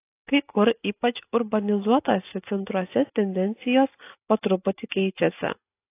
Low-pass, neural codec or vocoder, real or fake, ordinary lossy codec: 3.6 kHz; none; real; AAC, 24 kbps